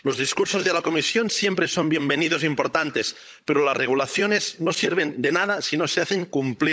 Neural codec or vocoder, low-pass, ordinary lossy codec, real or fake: codec, 16 kHz, 16 kbps, FunCodec, trained on LibriTTS, 50 frames a second; none; none; fake